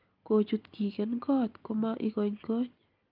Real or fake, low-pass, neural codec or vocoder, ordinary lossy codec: real; 5.4 kHz; none; Opus, 24 kbps